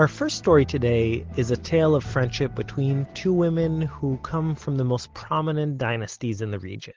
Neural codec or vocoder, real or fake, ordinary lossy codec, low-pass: none; real; Opus, 16 kbps; 7.2 kHz